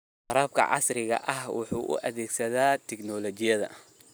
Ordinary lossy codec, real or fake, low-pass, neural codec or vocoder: none; real; none; none